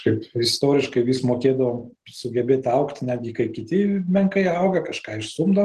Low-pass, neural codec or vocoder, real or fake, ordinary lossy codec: 14.4 kHz; none; real; Opus, 16 kbps